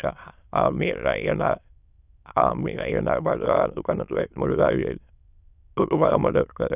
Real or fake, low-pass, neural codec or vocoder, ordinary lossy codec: fake; 3.6 kHz; autoencoder, 22.05 kHz, a latent of 192 numbers a frame, VITS, trained on many speakers; none